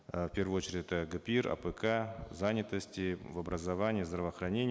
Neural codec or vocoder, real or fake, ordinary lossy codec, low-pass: none; real; none; none